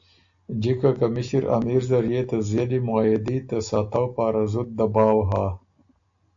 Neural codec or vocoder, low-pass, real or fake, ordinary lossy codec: none; 7.2 kHz; real; AAC, 64 kbps